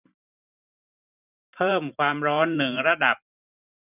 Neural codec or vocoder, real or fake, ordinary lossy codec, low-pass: vocoder, 44.1 kHz, 128 mel bands every 512 samples, BigVGAN v2; fake; none; 3.6 kHz